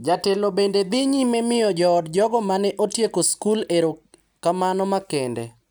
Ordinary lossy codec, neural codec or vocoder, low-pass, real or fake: none; none; none; real